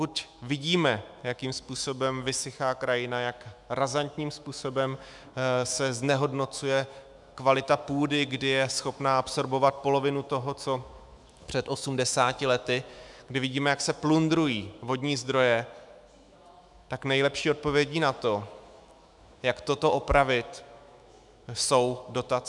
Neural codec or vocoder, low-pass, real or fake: autoencoder, 48 kHz, 128 numbers a frame, DAC-VAE, trained on Japanese speech; 10.8 kHz; fake